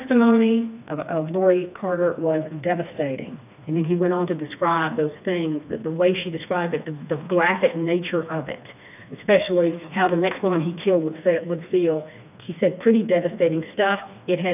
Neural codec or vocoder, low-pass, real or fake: codec, 16 kHz, 2 kbps, FreqCodec, smaller model; 3.6 kHz; fake